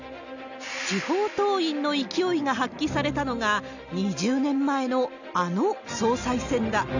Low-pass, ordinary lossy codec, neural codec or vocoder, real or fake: 7.2 kHz; none; none; real